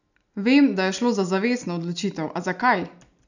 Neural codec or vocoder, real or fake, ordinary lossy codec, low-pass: none; real; none; 7.2 kHz